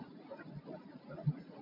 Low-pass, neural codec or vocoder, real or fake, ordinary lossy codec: 5.4 kHz; vocoder, 44.1 kHz, 80 mel bands, Vocos; fake; MP3, 32 kbps